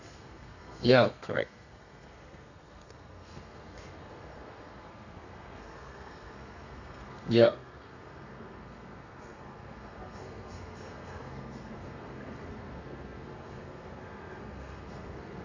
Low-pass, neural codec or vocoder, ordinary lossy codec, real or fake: 7.2 kHz; codec, 32 kHz, 1.9 kbps, SNAC; none; fake